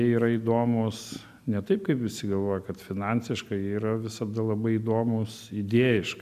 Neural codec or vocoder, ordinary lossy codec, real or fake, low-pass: none; AAC, 96 kbps; real; 14.4 kHz